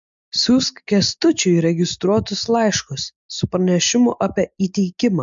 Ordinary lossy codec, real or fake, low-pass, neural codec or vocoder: MP3, 64 kbps; real; 7.2 kHz; none